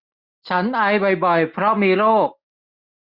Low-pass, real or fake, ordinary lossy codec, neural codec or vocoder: 5.4 kHz; real; Opus, 64 kbps; none